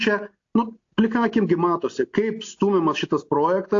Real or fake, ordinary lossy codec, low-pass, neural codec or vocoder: real; AAC, 48 kbps; 7.2 kHz; none